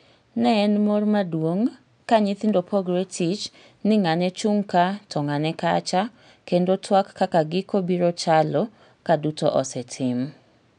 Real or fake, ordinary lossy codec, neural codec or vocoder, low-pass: real; none; none; 9.9 kHz